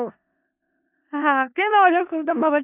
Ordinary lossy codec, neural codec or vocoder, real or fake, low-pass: none; codec, 16 kHz in and 24 kHz out, 0.4 kbps, LongCat-Audio-Codec, four codebook decoder; fake; 3.6 kHz